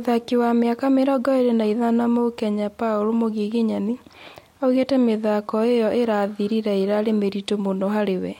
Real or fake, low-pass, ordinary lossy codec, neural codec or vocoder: real; 19.8 kHz; MP3, 64 kbps; none